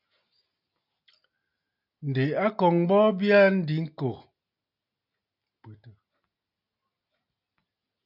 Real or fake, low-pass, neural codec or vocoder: real; 5.4 kHz; none